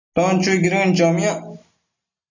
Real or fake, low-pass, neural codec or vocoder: real; 7.2 kHz; none